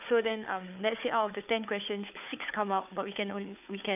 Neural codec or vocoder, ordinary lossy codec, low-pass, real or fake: codec, 16 kHz, 4 kbps, FunCodec, trained on Chinese and English, 50 frames a second; none; 3.6 kHz; fake